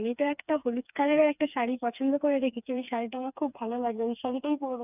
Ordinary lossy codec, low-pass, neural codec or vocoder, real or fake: none; 3.6 kHz; codec, 16 kHz, 4 kbps, FreqCodec, smaller model; fake